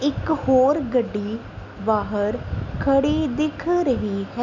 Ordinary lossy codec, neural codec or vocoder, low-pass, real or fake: none; none; 7.2 kHz; real